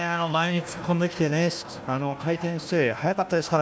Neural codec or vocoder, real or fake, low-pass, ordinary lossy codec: codec, 16 kHz, 1 kbps, FunCodec, trained on Chinese and English, 50 frames a second; fake; none; none